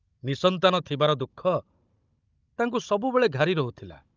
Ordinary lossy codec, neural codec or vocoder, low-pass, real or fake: Opus, 24 kbps; codec, 16 kHz, 16 kbps, FunCodec, trained on Chinese and English, 50 frames a second; 7.2 kHz; fake